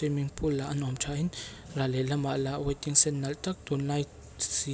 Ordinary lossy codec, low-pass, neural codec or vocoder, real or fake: none; none; none; real